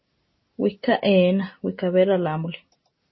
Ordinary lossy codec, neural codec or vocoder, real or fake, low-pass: MP3, 24 kbps; none; real; 7.2 kHz